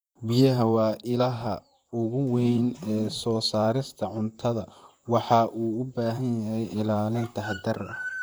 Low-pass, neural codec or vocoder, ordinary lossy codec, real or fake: none; vocoder, 44.1 kHz, 128 mel bands, Pupu-Vocoder; none; fake